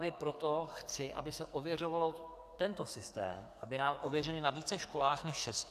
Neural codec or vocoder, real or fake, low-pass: codec, 44.1 kHz, 2.6 kbps, SNAC; fake; 14.4 kHz